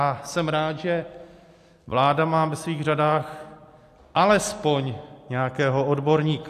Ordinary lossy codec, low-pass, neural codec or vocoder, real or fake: AAC, 64 kbps; 14.4 kHz; none; real